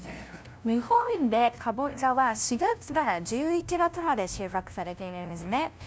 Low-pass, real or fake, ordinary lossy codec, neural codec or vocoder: none; fake; none; codec, 16 kHz, 0.5 kbps, FunCodec, trained on LibriTTS, 25 frames a second